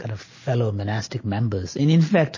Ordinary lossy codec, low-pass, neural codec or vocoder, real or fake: MP3, 32 kbps; 7.2 kHz; none; real